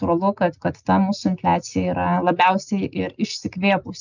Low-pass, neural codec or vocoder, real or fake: 7.2 kHz; none; real